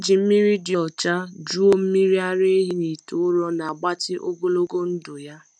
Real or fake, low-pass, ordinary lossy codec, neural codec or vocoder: real; none; none; none